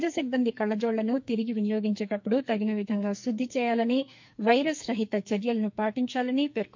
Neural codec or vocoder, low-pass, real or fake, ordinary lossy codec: codec, 44.1 kHz, 2.6 kbps, SNAC; 7.2 kHz; fake; MP3, 48 kbps